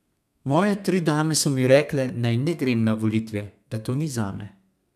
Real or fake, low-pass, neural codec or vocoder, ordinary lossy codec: fake; 14.4 kHz; codec, 32 kHz, 1.9 kbps, SNAC; none